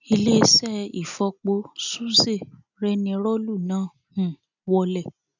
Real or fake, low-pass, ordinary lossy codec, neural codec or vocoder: real; 7.2 kHz; none; none